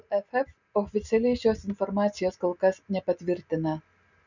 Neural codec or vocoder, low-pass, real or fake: none; 7.2 kHz; real